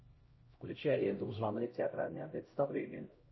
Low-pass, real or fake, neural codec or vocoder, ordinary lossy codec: 7.2 kHz; fake; codec, 16 kHz, 0.5 kbps, X-Codec, HuBERT features, trained on LibriSpeech; MP3, 24 kbps